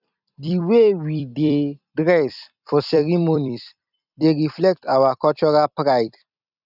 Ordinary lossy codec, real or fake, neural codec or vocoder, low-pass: none; fake; vocoder, 44.1 kHz, 128 mel bands every 256 samples, BigVGAN v2; 5.4 kHz